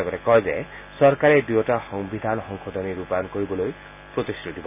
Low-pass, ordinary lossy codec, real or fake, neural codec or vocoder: 3.6 kHz; none; real; none